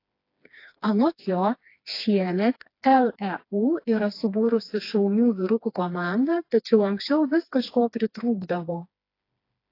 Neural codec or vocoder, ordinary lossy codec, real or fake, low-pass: codec, 16 kHz, 2 kbps, FreqCodec, smaller model; AAC, 32 kbps; fake; 5.4 kHz